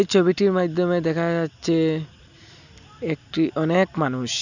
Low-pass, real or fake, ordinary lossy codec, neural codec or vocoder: 7.2 kHz; real; none; none